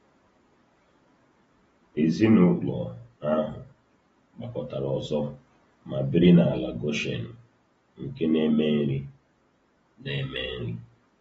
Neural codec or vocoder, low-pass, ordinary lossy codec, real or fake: none; 10.8 kHz; AAC, 24 kbps; real